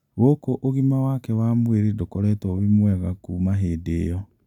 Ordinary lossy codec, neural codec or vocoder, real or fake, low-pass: none; none; real; 19.8 kHz